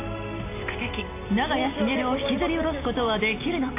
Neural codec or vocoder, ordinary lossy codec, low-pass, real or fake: none; AAC, 24 kbps; 3.6 kHz; real